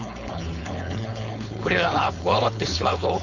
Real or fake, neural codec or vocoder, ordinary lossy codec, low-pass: fake; codec, 16 kHz, 4.8 kbps, FACodec; none; 7.2 kHz